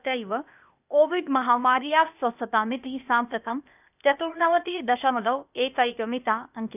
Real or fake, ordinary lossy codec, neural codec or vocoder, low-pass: fake; none; codec, 16 kHz, 0.8 kbps, ZipCodec; 3.6 kHz